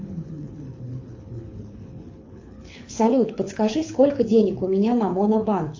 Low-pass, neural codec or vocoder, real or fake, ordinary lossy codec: 7.2 kHz; codec, 24 kHz, 6 kbps, HILCodec; fake; AAC, 48 kbps